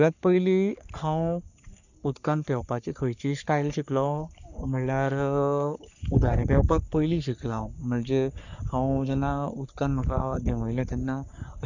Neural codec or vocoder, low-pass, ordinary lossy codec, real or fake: codec, 44.1 kHz, 3.4 kbps, Pupu-Codec; 7.2 kHz; none; fake